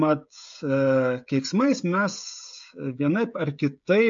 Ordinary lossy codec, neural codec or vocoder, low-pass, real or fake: AAC, 64 kbps; codec, 16 kHz, 16 kbps, FreqCodec, smaller model; 7.2 kHz; fake